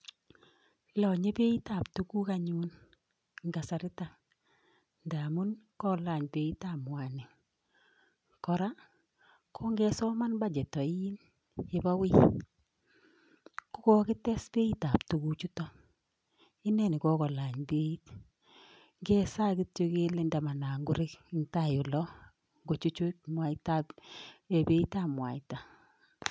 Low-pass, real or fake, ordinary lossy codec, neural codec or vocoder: none; real; none; none